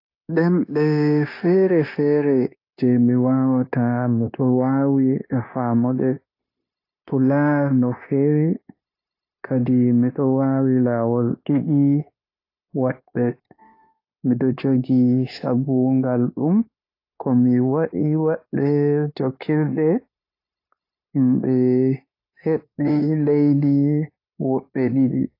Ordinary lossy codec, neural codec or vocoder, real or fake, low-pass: AAC, 32 kbps; codec, 16 kHz, 0.9 kbps, LongCat-Audio-Codec; fake; 5.4 kHz